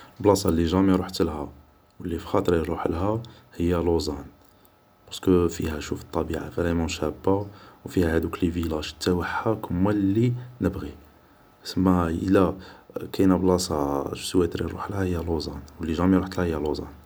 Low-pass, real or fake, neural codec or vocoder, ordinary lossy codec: none; real; none; none